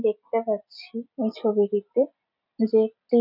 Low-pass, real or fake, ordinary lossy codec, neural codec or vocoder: 5.4 kHz; real; none; none